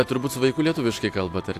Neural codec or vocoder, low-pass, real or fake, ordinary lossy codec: none; 14.4 kHz; real; AAC, 64 kbps